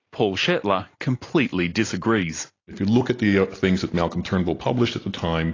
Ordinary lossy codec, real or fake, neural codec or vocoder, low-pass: AAC, 32 kbps; real; none; 7.2 kHz